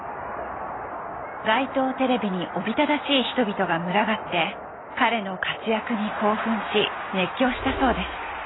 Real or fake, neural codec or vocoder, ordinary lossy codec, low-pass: real; none; AAC, 16 kbps; 7.2 kHz